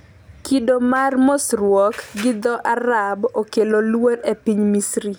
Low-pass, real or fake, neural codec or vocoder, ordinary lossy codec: none; real; none; none